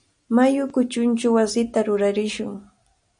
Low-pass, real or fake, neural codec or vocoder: 9.9 kHz; real; none